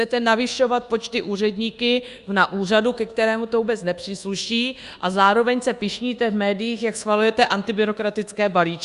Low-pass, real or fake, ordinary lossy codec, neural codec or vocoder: 10.8 kHz; fake; Opus, 64 kbps; codec, 24 kHz, 1.2 kbps, DualCodec